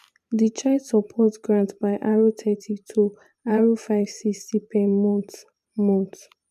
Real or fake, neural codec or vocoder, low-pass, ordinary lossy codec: fake; vocoder, 44.1 kHz, 128 mel bands every 512 samples, BigVGAN v2; 14.4 kHz; MP3, 96 kbps